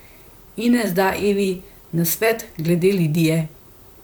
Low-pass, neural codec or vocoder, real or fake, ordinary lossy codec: none; vocoder, 44.1 kHz, 128 mel bands, Pupu-Vocoder; fake; none